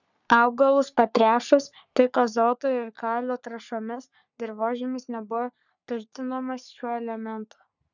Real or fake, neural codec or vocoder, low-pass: fake; codec, 44.1 kHz, 3.4 kbps, Pupu-Codec; 7.2 kHz